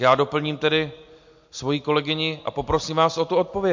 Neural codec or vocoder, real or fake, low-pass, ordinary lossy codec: none; real; 7.2 kHz; MP3, 48 kbps